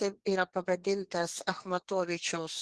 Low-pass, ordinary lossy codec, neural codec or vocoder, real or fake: 10.8 kHz; Opus, 16 kbps; codec, 32 kHz, 1.9 kbps, SNAC; fake